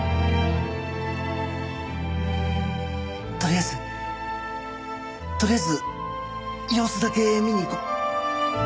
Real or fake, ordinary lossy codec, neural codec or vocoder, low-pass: real; none; none; none